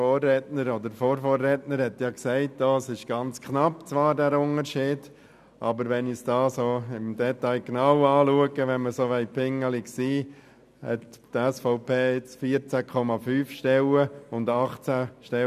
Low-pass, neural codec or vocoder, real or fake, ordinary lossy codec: 14.4 kHz; none; real; none